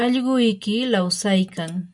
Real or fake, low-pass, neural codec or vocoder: real; 10.8 kHz; none